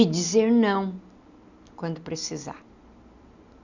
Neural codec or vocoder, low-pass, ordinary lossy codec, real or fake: none; 7.2 kHz; none; real